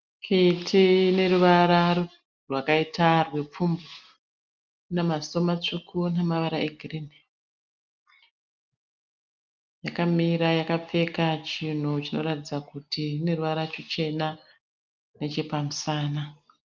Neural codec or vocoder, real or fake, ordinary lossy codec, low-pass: none; real; Opus, 32 kbps; 7.2 kHz